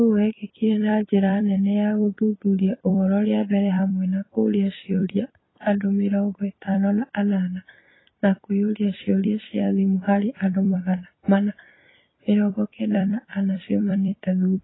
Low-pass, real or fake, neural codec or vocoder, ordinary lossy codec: 7.2 kHz; fake; vocoder, 44.1 kHz, 128 mel bands every 256 samples, BigVGAN v2; AAC, 16 kbps